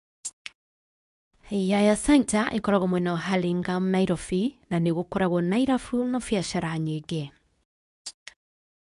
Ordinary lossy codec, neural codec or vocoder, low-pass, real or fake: none; codec, 24 kHz, 0.9 kbps, WavTokenizer, medium speech release version 2; 10.8 kHz; fake